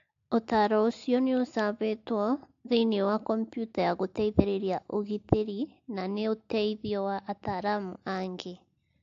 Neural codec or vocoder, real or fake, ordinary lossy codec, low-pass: none; real; AAC, 48 kbps; 7.2 kHz